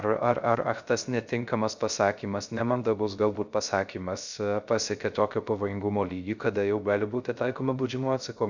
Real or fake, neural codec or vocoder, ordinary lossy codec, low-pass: fake; codec, 16 kHz, 0.3 kbps, FocalCodec; Opus, 64 kbps; 7.2 kHz